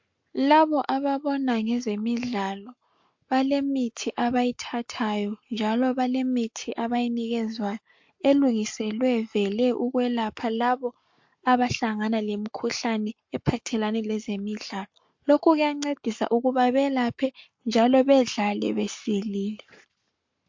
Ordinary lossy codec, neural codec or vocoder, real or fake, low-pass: MP3, 48 kbps; codec, 44.1 kHz, 7.8 kbps, Pupu-Codec; fake; 7.2 kHz